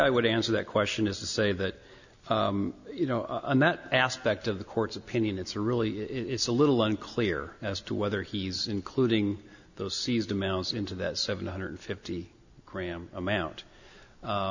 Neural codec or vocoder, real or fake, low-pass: none; real; 7.2 kHz